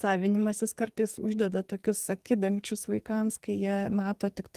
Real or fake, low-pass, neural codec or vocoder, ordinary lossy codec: fake; 14.4 kHz; codec, 44.1 kHz, 2.6 kbps, SNAC; Opus, 24 kbps